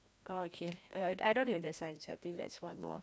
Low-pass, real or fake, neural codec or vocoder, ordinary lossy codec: none; fake; codec, 16 kHz, 1 kbps, FreqCodec, larger model; none